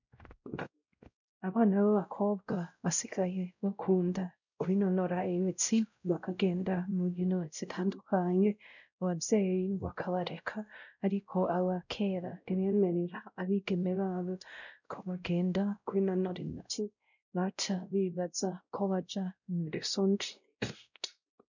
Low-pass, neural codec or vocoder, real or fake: 7.2 kHz; codec, 16 kHz, 0.5 kbps, X-Codec, WavLM features, trained on Multilingual LibriSpeech; fake